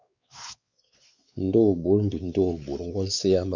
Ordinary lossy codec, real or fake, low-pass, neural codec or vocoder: Opus, 64 kbps; fake; 7.2 kHz; codec, 16 kHz, 4 kbps, X-Codec, WavLM features, trained on Multilingual LibriSpeech